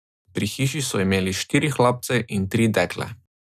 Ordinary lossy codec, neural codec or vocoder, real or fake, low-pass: none; none; real; 14.4 kHz